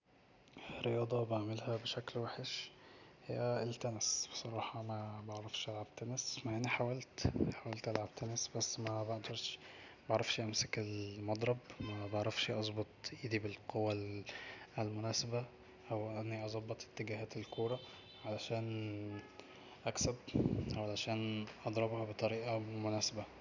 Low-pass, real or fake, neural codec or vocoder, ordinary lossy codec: 7.2 kHz; real; none; none